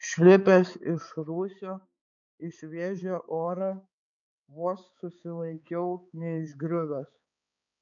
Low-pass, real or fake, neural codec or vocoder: 7.2 kHz; fake; codec, 16 kHz, 4 kbps, X-Codec, HuBERT features, trained on balanced general audio